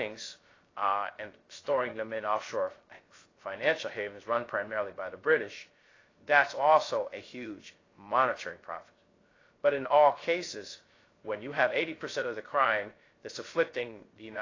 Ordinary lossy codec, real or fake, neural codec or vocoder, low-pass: AAC, 32 kbps; fake; codec, 16 kHz, 0.3 kbps, FocalCodec; 7.2 kHz